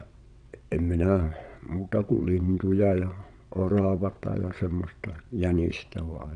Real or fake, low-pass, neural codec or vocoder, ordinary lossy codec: fake; 9.9 kHz; vocoder, 22.05 kHz, 80 mel bands, WaveNeXt; none